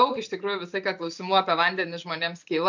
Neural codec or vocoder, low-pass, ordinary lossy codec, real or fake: none; 7.2 kHz; MP3, 64 kbps; real